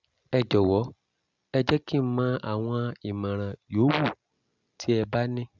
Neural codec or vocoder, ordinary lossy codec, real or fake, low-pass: none; Opus, 64 kbps; real; 7.2 kHz